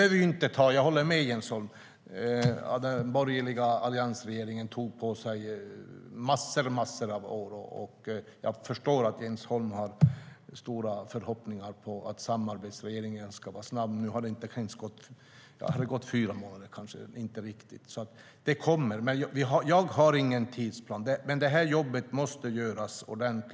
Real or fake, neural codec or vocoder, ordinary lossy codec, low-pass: real; none; none; none